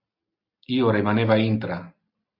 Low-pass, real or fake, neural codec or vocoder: 5.4 kHz; real; none